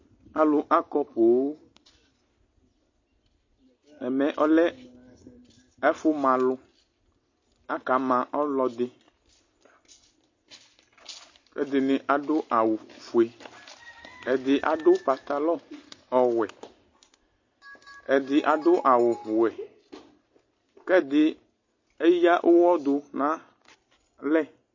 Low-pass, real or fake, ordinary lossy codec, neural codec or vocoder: 7.2 kHz; real; MP3, 32 kbps; none